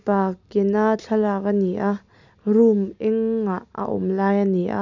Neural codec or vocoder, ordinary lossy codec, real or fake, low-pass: none; none; real; 7.2 kHz